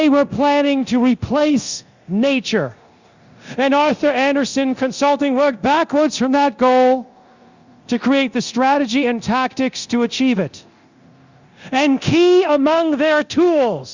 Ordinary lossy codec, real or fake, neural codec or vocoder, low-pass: Opus, 64 kbps; fake; codec, 24 kHz, 0.9 kbps, DualCodec; 7.2 kHz